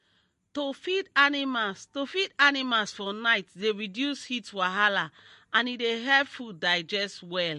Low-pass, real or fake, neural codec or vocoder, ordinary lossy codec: 14.4 kHz; real; none; MP3, 48 kbps